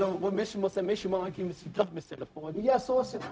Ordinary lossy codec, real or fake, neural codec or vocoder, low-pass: none; fake; codec, 16 kHz, 0.4 kbps, LongCat-Audio-Codec; none